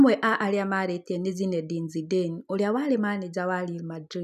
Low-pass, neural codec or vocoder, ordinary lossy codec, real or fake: 14.4 kHz; none; none; real